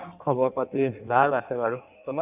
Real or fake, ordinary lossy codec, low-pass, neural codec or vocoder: fake; none; 3.6 kHz; codec, 16 kHz in and 24 kHz out, 1.1 kbps, FireRedTTS-2 codec